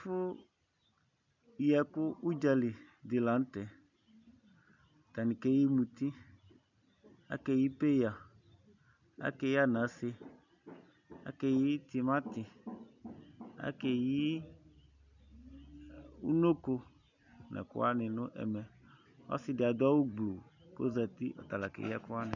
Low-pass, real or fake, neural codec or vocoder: 7.2 kHz; real; none